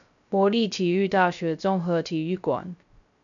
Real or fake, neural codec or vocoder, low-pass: fake; codec, 16 kHz, 0.3 kbps, FocalCodec; 7.2 kHz